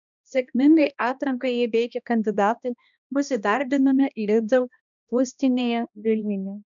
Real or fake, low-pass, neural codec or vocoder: fake; 7.2 kHz; codec, 16 kHz, 1 kbps, X-Codec, HuBERT features, trained on balanced general audio